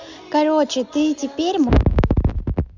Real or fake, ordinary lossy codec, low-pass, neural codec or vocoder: real; none; 7.2 kHz; none